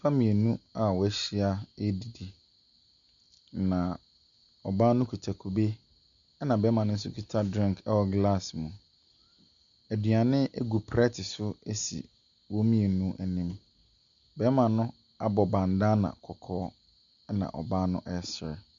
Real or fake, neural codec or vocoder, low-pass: real; none; 7.2 kHz